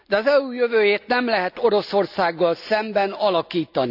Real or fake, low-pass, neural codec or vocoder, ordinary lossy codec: real; 5.4 kHz; none; none